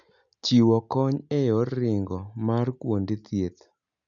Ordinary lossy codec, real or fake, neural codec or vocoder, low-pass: none; real; none; 7.2 kHz